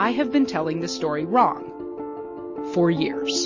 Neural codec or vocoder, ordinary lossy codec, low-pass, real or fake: none; MP3, 32 kbps; 7.2 kHz; real